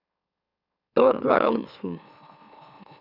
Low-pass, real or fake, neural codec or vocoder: 5.4 kHz; fake; autoencoder, 44.1 kHz, a latent of 192 numbers a frame, MeloTTS